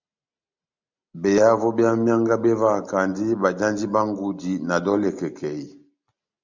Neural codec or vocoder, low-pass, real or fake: none; 7.2 kHz; real